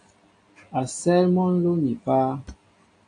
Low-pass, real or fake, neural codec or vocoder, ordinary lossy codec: 9.9 kHz; real; none; AAC, 48 kbps